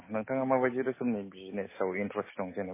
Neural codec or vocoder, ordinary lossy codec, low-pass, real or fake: none; MP3, 16 kbps; 3.6 kHz; real